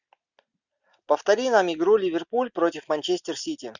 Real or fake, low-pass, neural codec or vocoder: real; 7.2 kHz; none